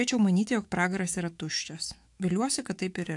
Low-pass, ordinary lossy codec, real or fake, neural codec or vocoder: 10.8 kHz; AAC, 64 kbps; fake; autoencoder, 48 kHz, 128 numbers a frame, DAC-VAE, trained on Japanese speech